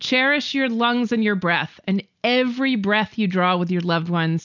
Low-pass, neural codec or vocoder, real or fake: 7.2 kHz; none; real